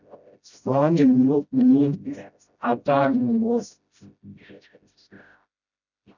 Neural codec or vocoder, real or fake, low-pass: codec, 16 kHz, 0.5 kbps, FreqCodec, smaller model; fake; 7.2 kHz